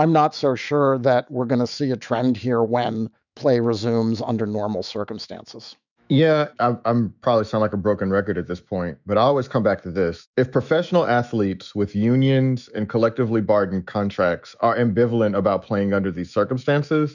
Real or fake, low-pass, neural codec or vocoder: fake; 7.2 kHz; autoencoder, 48 kHz, 128 numbers a frame, DAC-VAE, trained on Japanese speech